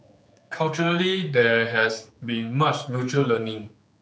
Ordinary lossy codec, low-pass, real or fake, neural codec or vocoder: none; none; fake; codec, 16 kHz, 4 kbps, X-Codec, HuBERT features, trained on general audio